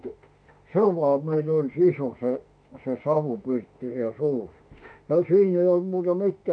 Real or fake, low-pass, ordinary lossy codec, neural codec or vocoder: fake; 9.9 kHz; AAC, 64 kbps; autoencoder, 48 kHz, 32 numbers a frame, DAC-VAE, trained on Japanese speech